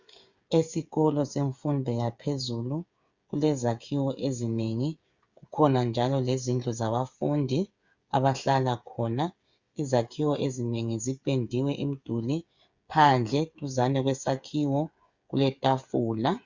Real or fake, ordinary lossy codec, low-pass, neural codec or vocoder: fake; Opus, 64 kbps; 7.2 kHz; codec, 16 kHz, 8 kbps, FreqCodec, smaller model